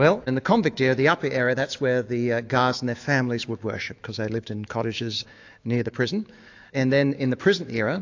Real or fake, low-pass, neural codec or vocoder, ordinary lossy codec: fake; 7.2 kHz; autoencoder, 48 kHz, 128 numbers a frame, DAC-VAE, trained on Japanese speech; AAC, 48 kbps